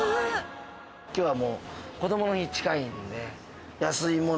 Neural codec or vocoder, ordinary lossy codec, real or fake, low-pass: none; none; real; none